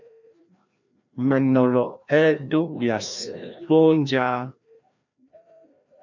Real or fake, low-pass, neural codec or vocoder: fake; 7.2 kHz; codec, 16 kHz, 1 kbps, FreqCodec, larger model